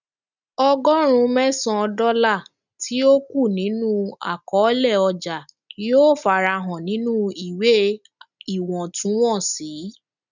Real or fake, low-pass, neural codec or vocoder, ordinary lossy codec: real; 7.2 kHz; none; none